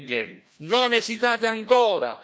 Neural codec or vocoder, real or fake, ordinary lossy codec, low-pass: codec, 16 kHz, 1 kbps, FreqCodec, larger model; fake; none; none